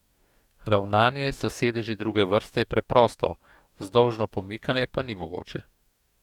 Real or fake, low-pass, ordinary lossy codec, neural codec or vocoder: fake; 19.8 kHz; none; codec, 44.1 kHz, 2.6 kbps, DAC